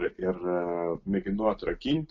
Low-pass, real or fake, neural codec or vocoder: 7.2 kHz; real; none